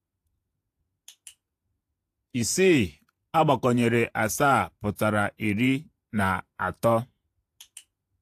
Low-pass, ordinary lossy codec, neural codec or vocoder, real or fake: 14.4 kHz; AAC, 64 kbps; vocoder, 48 kHz, 128 mel bands, Vocos; fake